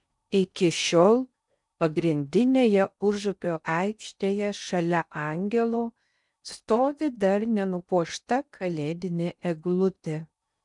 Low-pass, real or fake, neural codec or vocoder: 10.8 kHz; fake; codec, 16 kHz in and 24 kHz out, 0.6 kbps, FocalCodec, streaming, 4096 codes